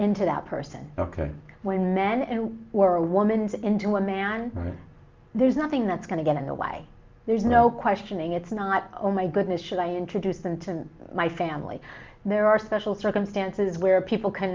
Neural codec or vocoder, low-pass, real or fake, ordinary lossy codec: none; 7.2 kHz; real; Opus, 24 kbps